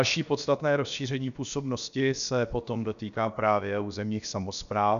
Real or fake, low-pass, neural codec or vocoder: fake; 7.2 kHz; codec, 16 kHz, about 1 kbps, DyCAST, with the encoder's durations